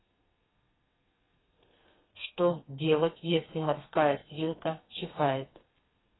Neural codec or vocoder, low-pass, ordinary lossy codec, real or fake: codec, 32 kHz, 1.9 kbps, SNAC; 7.2 kHz; AAC, 16 kbps; fake